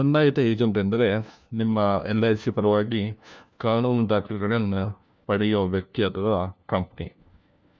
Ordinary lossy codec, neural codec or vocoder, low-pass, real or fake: none; codec, 16 kHz, 1 kbps, FunCodec, trained on LibriTTS, 50 frames a second; none; fake